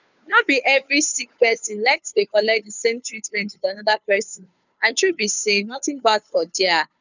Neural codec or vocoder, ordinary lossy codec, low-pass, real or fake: codec, 16 kHz, 2 kbps, FunCodec, trained on Chinese and English, 25 frames a second; none; 7.2 kHz; fake